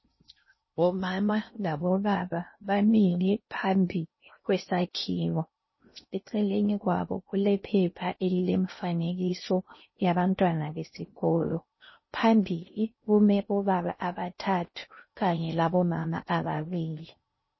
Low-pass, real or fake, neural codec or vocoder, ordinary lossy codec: 7.2 kHz; fake; codec, 16 kHz in and 24 kHz out, 0.6 kbps, FocalCodec, streaming, 4096 codes; MP3, 24 kbps